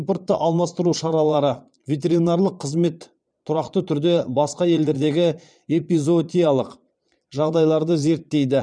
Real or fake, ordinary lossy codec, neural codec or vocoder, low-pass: fake; none; vocoder, 22.05 kHz, 80 mel bands, Vocos; 9.9 kHz